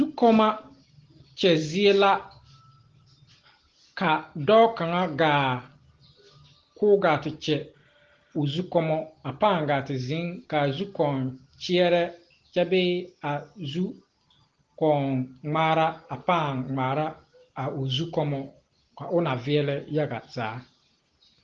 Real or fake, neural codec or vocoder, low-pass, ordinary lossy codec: real; none; 7.2 kHz; Opus, 16 kbps